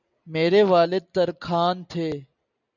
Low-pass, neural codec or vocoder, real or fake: 7.2 kHz; none; real